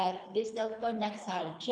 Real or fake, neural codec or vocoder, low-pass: fake; codec, 24 kHz, 3 kbps, HILCodec; 9.9 kHz